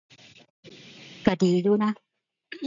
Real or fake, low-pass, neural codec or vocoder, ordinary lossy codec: real; 7.2 kHz; none; none